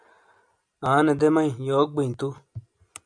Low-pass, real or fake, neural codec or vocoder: 9.9 kHz; real; none